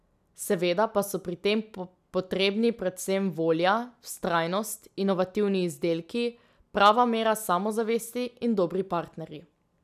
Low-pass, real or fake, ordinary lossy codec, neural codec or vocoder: 14.4 kHz; real; none; none